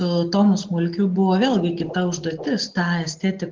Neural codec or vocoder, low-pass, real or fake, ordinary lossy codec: none; 7.2 kHz; real; Opus, 16 kbps